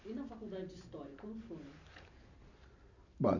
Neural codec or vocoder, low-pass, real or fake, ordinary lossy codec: none; 7.2 kHz; real; none